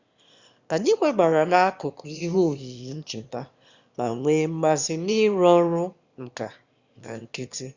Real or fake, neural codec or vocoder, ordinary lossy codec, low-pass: fake; autoencoder, 22.05 kHz, a latent of 192 numbers a frame, VITS, trained on one speaker; Opus, 64 kbps; 7.2 kHz